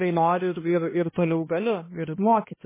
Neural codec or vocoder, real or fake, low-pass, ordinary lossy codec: codec, 16 kHz, 1 kbps, X-Codec, HuBERT features, trained on balanced general audio; fake; 3.6 kHz; MP3, 16 kbps